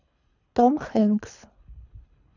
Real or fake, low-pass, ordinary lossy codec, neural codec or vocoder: fake; 7.2 kHz; none; codec, 24 kHz, 3 kbps, HILCodec